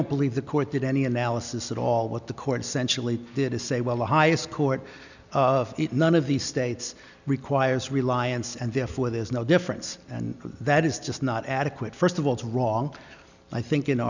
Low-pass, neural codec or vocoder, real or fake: 7.2 kHz; none; real